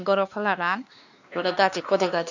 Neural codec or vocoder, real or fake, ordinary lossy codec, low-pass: codec, 16 kHz, 2 kbps, X-Codec, WavLM features, trained on Multilingual LibriSpeech; fake; none; 7.2 kHz